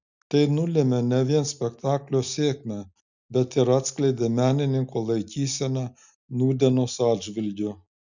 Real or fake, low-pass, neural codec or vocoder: real; 7.2 kHz; none